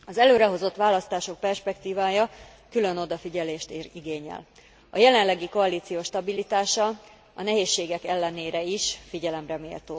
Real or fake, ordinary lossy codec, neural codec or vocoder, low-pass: real; none; none; none